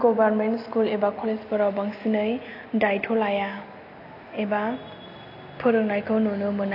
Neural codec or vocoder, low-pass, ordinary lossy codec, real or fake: none; 5.4 kHz; AAC, 32 kbps; real